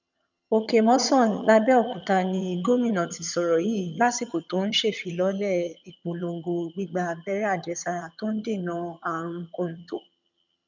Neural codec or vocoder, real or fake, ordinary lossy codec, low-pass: vocoder, 22.05 kHz, 80 mel bands, HiFi-GAN; fake; none; 7.2 kHz